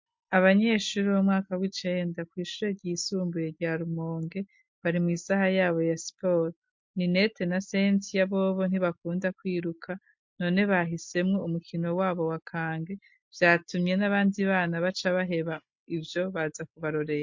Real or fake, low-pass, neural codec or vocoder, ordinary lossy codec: real; 7.2 kHz; none; MP3, 48 kbps